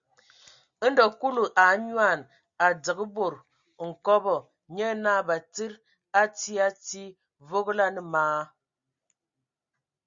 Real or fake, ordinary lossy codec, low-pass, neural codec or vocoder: real; Opus, 64 kbps; 7.2 kHz; none